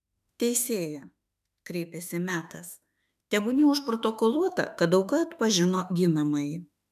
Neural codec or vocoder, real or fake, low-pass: autoencoder, 48 kHz, 32 numbers a frame, DAC-VAE, trained on Japanese speech; fake; 14.4 kHz